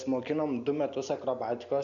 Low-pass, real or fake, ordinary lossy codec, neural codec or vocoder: 7.2 kHz; real; AAC, 64 kbps; none